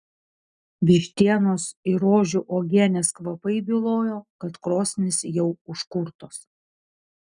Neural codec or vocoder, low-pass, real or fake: none; 9.9 kHz; real